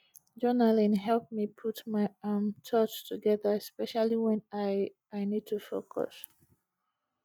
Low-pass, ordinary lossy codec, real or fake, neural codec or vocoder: 19.8 kHz; none; real; none